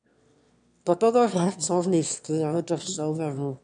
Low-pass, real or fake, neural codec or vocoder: 9.9 kHz; fake; autoencoder, 22.05 kHz, a latent of 192 numbers a frame, VITS, trained on one speaker